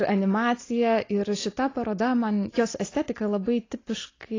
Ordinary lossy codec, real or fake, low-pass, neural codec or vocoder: AAC, 32 kbps; real; 7.2 kHz; none